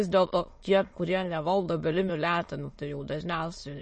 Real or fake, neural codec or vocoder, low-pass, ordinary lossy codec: fake; autoencoder, 22.05 kHz, a latent of 192 numbers a frame, VITS, trained on many speakers; 9.9 kHz; MP3, 32 kbps